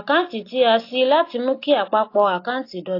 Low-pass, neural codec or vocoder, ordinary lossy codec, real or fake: 5.4 kHz; none; AAC, 32 kbps; real